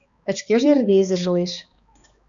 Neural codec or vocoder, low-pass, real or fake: codec, 16 kHz, 2 kbps, X-Codec, HuBERT features, trained on balanced general audio; 7.2 kHz; fake